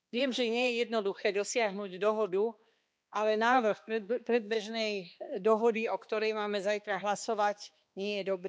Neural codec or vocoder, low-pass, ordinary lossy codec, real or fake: codec, 16 kHz, 2 kbps, X-Codec, HuBERT features, trained on balanced general audio; none; none; fake